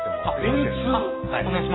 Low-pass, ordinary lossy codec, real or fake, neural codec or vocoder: 7.2 kHz; AAC, 16 kbps; real; none